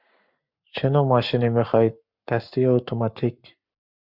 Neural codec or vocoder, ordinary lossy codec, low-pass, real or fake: codec, 44.1 kHz, 7.8 kbps, Pupu-Codec; Opus, 64 kbps; 5.4 kHz; fake